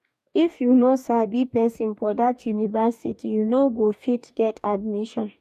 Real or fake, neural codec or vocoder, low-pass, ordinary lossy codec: fake; codec, 44.1 kHz, 2.6 kbps, DAC; 14.4 kHz; none